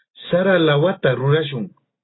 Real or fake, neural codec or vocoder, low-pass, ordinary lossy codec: real; none; 7.2 kHz; AAC, 16 kbps